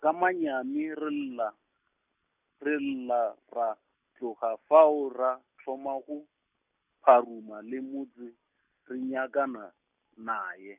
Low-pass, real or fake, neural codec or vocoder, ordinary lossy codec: 3.6 kHz; real; none; none